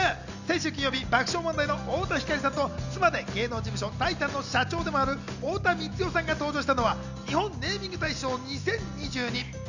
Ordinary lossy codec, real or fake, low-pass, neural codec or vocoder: none; real; 7.2 kHz; none